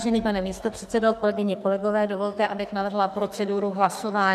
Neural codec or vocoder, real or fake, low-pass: codec, 44.1 kHz, 2.6 kbps, SNAC; fake; 14.4 kHz